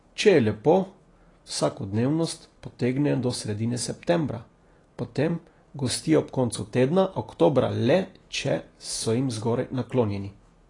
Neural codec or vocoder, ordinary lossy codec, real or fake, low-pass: none; AAC, 32 kbps; real; 10.8 kHz